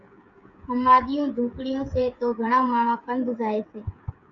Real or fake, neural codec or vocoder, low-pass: fake; codec, 16 kHz, 8 kbps, FreqCodec, smaller model; 7.2 kHz